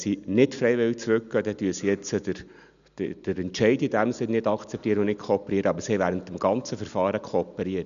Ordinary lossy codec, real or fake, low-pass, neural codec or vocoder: none; real; 7.2 kHz; none